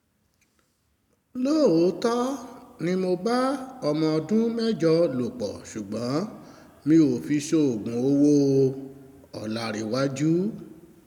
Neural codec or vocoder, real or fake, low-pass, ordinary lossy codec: none; real; 19.8 kHz; none